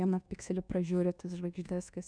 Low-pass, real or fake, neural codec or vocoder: 9.9 kHz; fake; codec, 24 kHz, 1.2 kbps, DualCodec